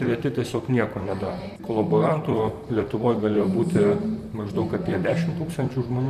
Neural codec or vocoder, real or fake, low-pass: vocoder, 44.1 kHz, 128 mel bands, Pupu-Vocoder; fake; 14.4 kHz